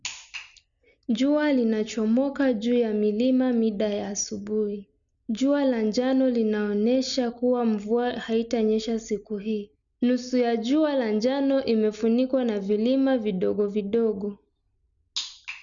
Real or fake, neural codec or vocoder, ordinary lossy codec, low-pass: real; none; none; 7.2 kHz